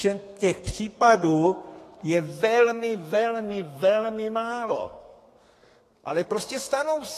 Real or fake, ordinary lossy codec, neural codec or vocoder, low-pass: fake; AAC, 48 kbps; codec, 32 kHz, 1.9 kbps, SNAC; 14.4 kHz